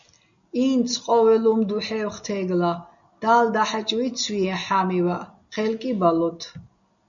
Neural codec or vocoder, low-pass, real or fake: none; 7.2 kHz; real